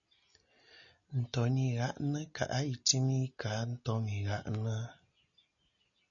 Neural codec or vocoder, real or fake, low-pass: none; real; 7.2 kHz